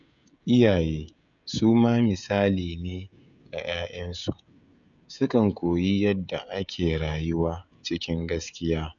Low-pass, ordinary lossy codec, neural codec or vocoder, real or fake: 7.2 kHz; none; codec, 16 kHz, 16 kbps, FreqCodec, smaller model; fake